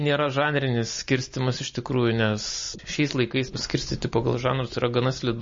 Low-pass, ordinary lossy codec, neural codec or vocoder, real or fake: 7.2 kHz; MP3, 32 kbps; none; real